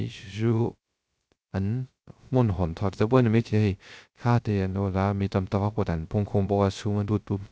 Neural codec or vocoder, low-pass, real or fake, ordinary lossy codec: codec, 16 kHz, 0.3 kbps, FocalCodec; none; fake; none